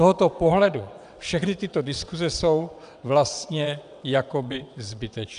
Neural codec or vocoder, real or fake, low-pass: vocoder, 22.05 kHz, 80 mel bands, WaveNeXt; fake; 9.9 kHz